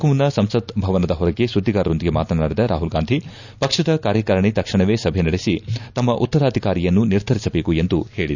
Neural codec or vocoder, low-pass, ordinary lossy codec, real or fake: none; 7.2 kHz; none; real